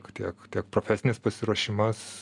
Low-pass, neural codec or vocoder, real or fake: 10.8 kHz; none; real